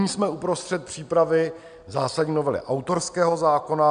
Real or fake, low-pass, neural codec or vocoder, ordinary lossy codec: real; 9.9 kHz; none; MP3, 96 kbps